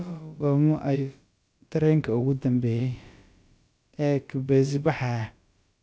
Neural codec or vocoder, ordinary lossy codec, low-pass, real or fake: codec, 16 kHz, about 1 kbps, DyCAST, with the encoder's durations; none; none; fake